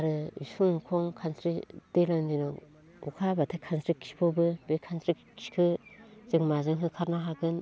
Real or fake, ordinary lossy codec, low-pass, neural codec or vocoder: real; none; none; none